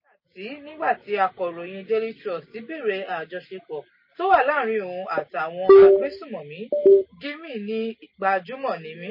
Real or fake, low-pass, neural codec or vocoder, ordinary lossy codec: real; 5.4 kHz; none; MP3, 24 kbps